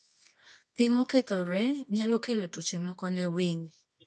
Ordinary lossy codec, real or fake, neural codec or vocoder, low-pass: none; fake; codec, 24 kHz, 0.9 kbps, WavTokenizer, medium music audio release; 10.8 kHz